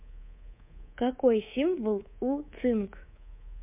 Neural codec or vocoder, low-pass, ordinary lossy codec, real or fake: codec, 24 kHz, 3.1 kbps, DualCodec; 3.6 kHz; MP3, 32 kbps; fake